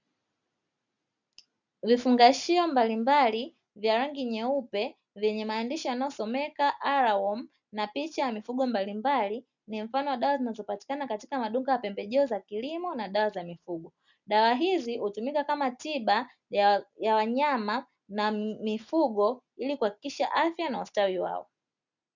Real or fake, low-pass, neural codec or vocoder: real; 7.2 kHz; none